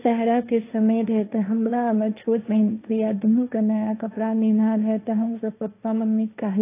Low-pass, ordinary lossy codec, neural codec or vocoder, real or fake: 3.6 kHz; AAC, 24 kbps; codec, 16 kHz, 1.1 kbps, Voila-Tokenizer; fake